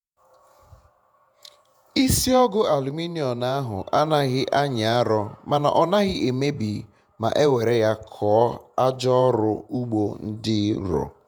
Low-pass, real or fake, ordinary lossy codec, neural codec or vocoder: 19.8 kHz; fake; none; vocoder, 48 kHz, 128 mel bands, Vocos